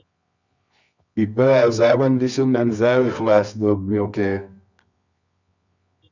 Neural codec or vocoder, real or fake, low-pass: codec, 24 kHz, 0.9 kbps, WavTokenizer, medium music audio release; fake; 7.2 kHz